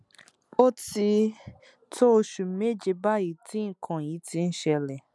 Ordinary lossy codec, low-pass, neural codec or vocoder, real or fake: none; none; none; real